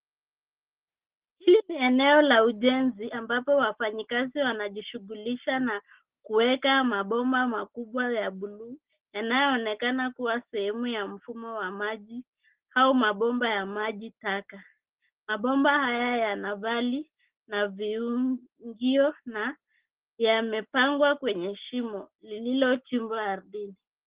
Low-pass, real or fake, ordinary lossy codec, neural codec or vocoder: 3.6 kHz; real; Opus, 16 kbps; none